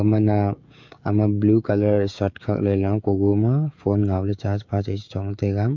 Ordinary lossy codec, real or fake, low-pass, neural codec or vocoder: MP3, 48 kbps; fake; 7.2 kHz; codec, 16 kHz, 16 kbps, FreqCodec, smaller model